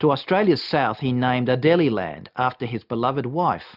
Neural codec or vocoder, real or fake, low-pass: none; real; 5.4 kHz